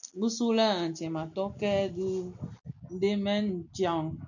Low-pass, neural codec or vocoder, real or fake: 7.2 kHz; none; real